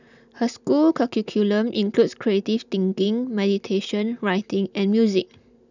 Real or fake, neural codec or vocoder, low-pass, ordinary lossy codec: real; none; 7.2 kHz; none